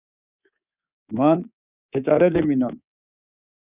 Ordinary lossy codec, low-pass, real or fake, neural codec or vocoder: Opus, 64 kbps; 3.6 kHz; fake; codec, 24 kHz, 3.1 kbps, DualCodec